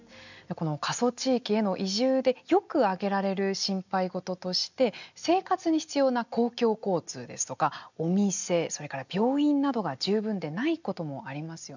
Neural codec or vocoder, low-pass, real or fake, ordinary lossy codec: none; 7.2 kHz; real; none